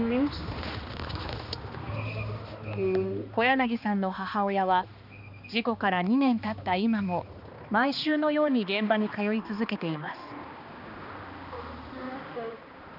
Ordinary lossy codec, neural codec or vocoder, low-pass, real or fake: none; codec, 16 kHz, 2 kbps, X-Codec, HuBERT features, trained on balanced general audio; 5.4 kHz; fake